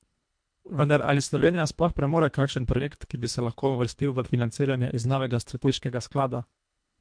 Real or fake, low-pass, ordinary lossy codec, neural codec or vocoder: fake; 9.9 kHz; MP3, 64 kbps; codec, 24 kHz, 1.5 kbps, HILCodec